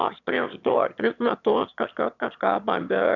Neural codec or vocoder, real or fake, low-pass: autoencoder, 22.05 kHz, a latent of 192 numbers a frame, VITS, trained on one speaker; fake; 7.2 kHz